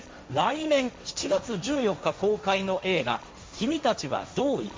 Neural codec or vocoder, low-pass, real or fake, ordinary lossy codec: codec, 16 kHz, 1.1 kbps, Voila-Tokenizer; none; fake; none